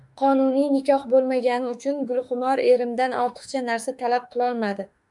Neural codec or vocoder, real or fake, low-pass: autoencoder, 48 kHz, 32 numbers a frame, DAC-VAE, trained on Japanese speech; fake; 10.8 kHz